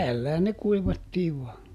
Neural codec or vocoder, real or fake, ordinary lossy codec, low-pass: none; real; none; 14.4 kHz